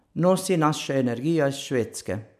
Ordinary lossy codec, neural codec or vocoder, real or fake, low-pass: none; none; real; 14.4 kHz